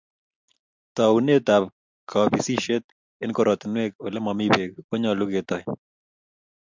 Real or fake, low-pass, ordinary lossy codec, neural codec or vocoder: real; 7.2 kHz; MP3, 64 kbps; none